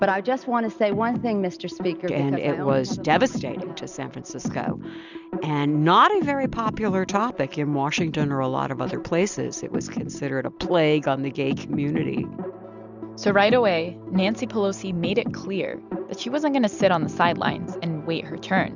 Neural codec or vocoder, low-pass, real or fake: none; 7.2 kHz; real